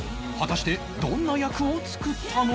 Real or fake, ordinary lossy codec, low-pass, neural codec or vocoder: real; none; none; none